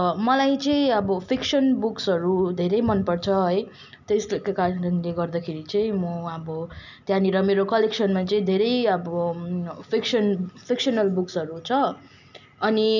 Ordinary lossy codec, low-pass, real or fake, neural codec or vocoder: none; 7.2 kHz; real; none